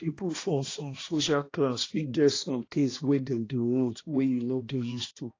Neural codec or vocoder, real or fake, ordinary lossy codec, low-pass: codec, 16 kHz, 1 kbps, X-Codec, HuBERT features, trained on balanced general audio; fake; AAC, 32 kbps; 7.2 kHz